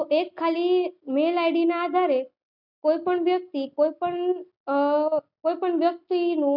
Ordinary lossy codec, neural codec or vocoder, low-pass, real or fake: none; none; 5.4 kHz; real